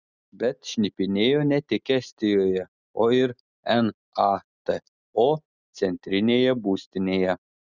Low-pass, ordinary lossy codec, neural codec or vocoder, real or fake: 7.2 kHz; Opus, 64 kbps; none; real